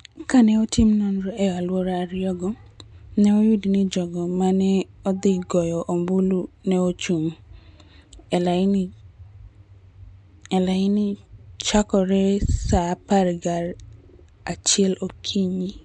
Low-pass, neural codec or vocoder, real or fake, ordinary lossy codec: 9.9 kHz; none; real; MP3, 64 kbps